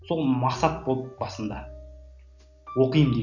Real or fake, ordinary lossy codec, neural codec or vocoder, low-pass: real; none; none; 7.2 kHz